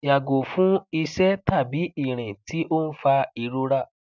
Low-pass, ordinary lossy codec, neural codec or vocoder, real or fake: 7.2 kHz; none; none; real